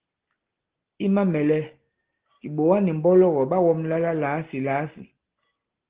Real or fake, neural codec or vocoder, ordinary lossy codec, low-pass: real; none; Opus, 16 kbps; 3.6 kHz